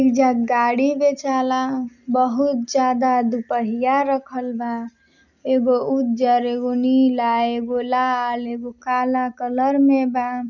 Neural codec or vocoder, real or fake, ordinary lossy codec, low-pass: none; real; none; 7.2 kHz